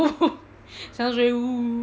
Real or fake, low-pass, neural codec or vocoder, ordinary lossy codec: real; none; none; none